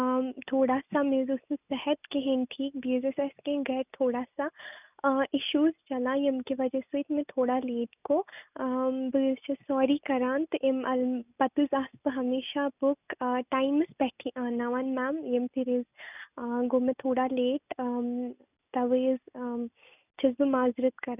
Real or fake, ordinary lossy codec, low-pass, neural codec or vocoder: real; none; 3.6 kHz; none